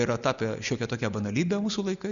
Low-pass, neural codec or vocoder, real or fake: 7.2 kHz; none; real